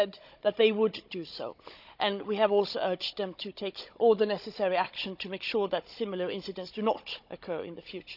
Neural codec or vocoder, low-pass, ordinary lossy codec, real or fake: codec, 16 kHz, 16 kbps, FunCodec, trained on Chinese and English, 50 frames a second; 5.4 kHz; none; fake